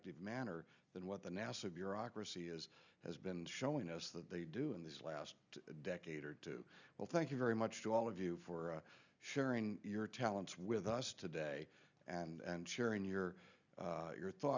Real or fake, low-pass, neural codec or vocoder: real; 7.2 kHz; none